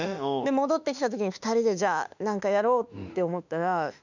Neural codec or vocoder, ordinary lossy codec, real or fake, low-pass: autoencoder, 48 kHz, 32 numbers a frame, DAC-VAE, trained on Japanese speech; none; fake; 7.2 kHz